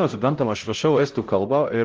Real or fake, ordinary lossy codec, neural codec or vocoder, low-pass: fake; Opus, 16 kbps; codec, 16 kHz, 0.5 kbps, X-Codec, HuBERT features, trained on LibriSpeech; 7.2 kHz